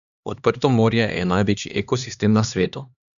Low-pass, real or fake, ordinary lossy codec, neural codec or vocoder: 7.2 kHz; fake; none; codec, 16 kHz, 2 kbps, X-Codec, HuBERT features, trained on LibriSpeech